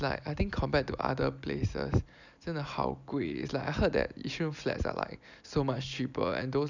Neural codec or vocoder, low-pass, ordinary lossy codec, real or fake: none; 7.2 kHz; none; real